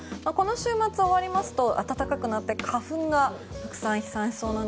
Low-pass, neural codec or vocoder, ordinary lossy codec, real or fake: none; none; none; real